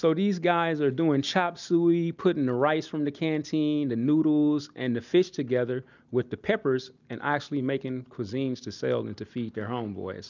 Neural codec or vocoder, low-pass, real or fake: none; 7.2 kHz; real